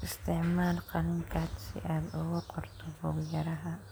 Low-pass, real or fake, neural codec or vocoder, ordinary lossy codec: none; real; none; none